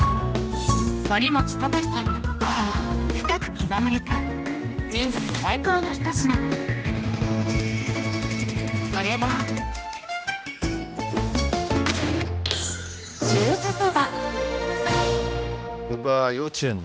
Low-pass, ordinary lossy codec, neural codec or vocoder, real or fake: none; none; codec, 16 kHz, 1 kbps, X-Codec, HuBERT features, trained on general audio; fake